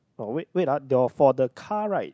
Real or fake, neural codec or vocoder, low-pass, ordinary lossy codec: real; none; none; none